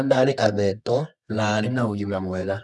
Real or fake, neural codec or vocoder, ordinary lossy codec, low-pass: fake; codec, 24 kHz, 0.9 kbps, WavTokenizer, medium music audio release; none; none